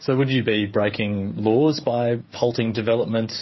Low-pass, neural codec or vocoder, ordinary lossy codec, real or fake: 7.2 kHz; codec, 16 kHz, 8 kbps, FreqCodec, smaller model; MP3, 24 kbps; fake